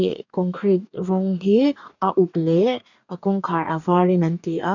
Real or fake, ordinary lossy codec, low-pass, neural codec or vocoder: fake; none; 7.2 kHz; codec, 44.1 kHz, 2.6 kbps, DAC